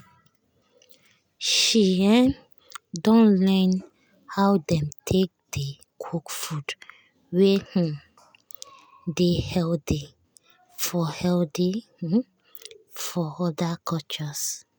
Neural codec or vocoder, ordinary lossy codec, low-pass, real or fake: none; none; none; real